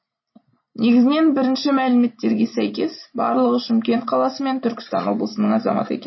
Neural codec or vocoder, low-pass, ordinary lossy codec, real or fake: none; 7.2 kHz; MP3, 24 kbps; real